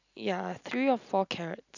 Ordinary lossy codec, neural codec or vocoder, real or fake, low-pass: none; none; real; 7.2 kHz